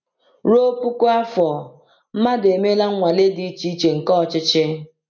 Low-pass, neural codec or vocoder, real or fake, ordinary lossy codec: 7.2 kHz; none; real; none